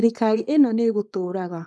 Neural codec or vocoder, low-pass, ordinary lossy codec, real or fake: codec, 24 kHz, 0.9 kbps, WavTokenizer, small release; none; none; fake